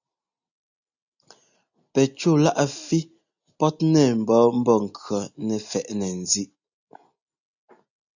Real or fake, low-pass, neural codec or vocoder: real; 7.2 kHz; none